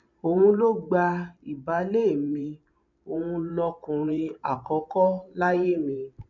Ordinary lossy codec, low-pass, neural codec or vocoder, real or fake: none; 7.2 kHz; vocoder, 44.1 kHz, 128 mel bands every 512 samples, BigVGAN v2; fake